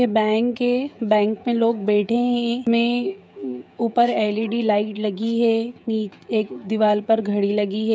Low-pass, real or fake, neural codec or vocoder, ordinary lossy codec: none; fake; codec, 16 kHz, 16 kbps, FreqCodec, smaller model; none